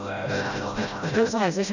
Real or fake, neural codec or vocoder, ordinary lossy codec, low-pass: fake; codec, 16 kHz, 0.5 kbps, FreqCodec, smaller model; none; 7.2 kHz